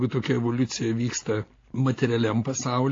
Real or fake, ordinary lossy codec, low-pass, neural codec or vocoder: real; AAC, 32 kbps; 7.2 kHz; none